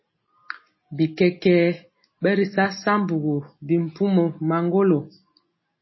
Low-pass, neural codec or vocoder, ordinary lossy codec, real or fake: 7.2 kHz; none; MP3, 24 kbps; real